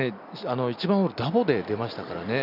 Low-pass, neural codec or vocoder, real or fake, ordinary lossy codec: 5.4 kHz; none; real; none